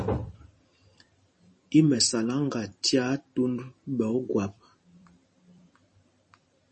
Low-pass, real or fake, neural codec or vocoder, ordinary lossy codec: 10.8 kHz; fake; vocoder, 44.1 kHz, 128 mel bands every 512 samples, BigVGAN v2; MP3, 32 kbps